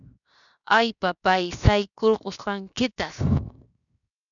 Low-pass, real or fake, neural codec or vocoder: 7.2 kHz; fake; codec, 16 kHz, 0.7 kbps, FocalCodec